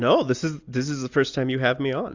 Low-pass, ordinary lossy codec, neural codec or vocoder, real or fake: 7.2 kHz; Opus, 64 kbps; none; real